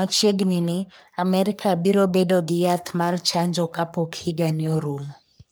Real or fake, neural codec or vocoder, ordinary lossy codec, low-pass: fake; codec, 44.1 kHz, 3.4 kbps, Pupu-Codec; none; none